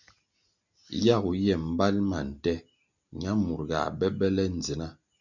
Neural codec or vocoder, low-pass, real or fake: none; 7.2 kHz; real